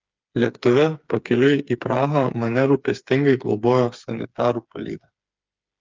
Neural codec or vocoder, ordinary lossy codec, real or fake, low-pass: codec, 16 kHz, 4 kbps, FreqCodec, smaller model; Opus, 24 kbps; fake; 7.2 kHz